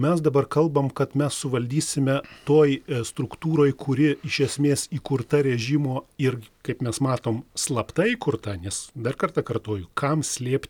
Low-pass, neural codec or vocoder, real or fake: 19.8 kHz; none; real